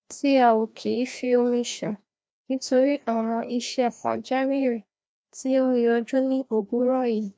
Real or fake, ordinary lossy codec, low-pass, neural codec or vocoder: fake; none; none; codec, 16 kHz, 1 kbps, FreqCodec, larger model